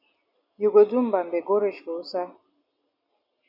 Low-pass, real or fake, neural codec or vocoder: 5.4 kHz; real; none